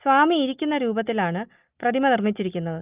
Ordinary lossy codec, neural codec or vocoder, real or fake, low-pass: Opus, 24 kbps; none; real; 3.6 kHz